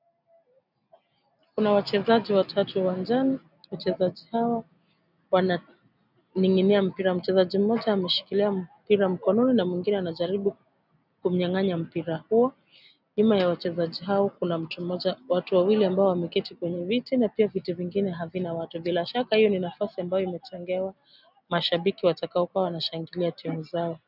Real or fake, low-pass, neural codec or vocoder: real; 5.4 kHz; none